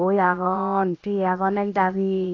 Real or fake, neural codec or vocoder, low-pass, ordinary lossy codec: fake; codec, 16 kHz, about 1 kbps, DyCAST, with the encoder's durations; 7.2 kHz; AAC, 32 kbps